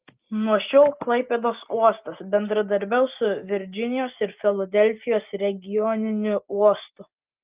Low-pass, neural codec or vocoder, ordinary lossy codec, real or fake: 3.6 kHz; none; Opus, 32 kbps; real